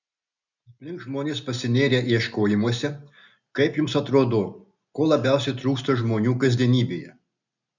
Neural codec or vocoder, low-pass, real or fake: none; 7.2 kHz; real